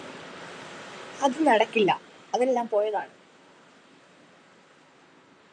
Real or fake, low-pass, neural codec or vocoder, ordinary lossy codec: fake; 9.9 kHz; vocoder, 44.1 kHz, 128 mel bands, Pupu-Vocoder; MP3, 64 kbps